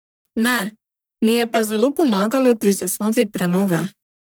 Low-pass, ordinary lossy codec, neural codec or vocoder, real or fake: none; none; codec, 44.1 kHz, 1.7 kbps, Pupu-Codec; fake